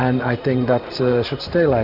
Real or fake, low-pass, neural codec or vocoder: fake; 5.4 kHz; vocoder, 44.1 kHz, 128 mel bands every 512 samples, BigVGAN v2